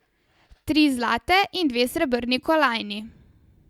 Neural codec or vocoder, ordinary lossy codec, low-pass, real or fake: none; Opus, 64 kbps; 19.8 kHz; real